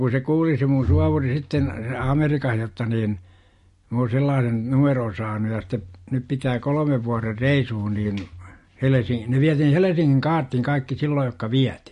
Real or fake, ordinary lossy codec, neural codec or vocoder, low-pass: real; MP3, 48 kbps; none; 14.4 kHz